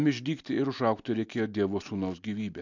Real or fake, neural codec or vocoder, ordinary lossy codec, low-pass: real; none; MP3, 64 kbps; 7.2 kHz